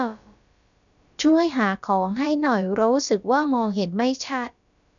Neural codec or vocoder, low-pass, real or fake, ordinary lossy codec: codec, 16 kHz, about 1 kbps, DyCAST, with the encoder's durations; 7.2 kHz; fake; none